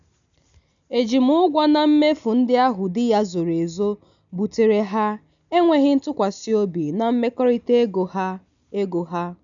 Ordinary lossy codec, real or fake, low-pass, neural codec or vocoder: none; real; 7.2 kHz; none